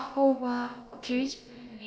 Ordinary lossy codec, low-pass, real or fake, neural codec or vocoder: none; none; fake; codec, 16 kHz, about 1 kbps, DyCAST, with the encoder's durations